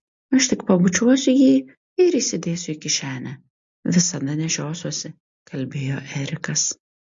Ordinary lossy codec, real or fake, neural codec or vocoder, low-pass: MP3, 48 kbps; real; none; 7.2 kHz